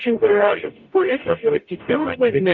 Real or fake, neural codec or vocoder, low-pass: fake; codec, 44.1 kHz, 0.9 kbps, DAC; 7.2 kHz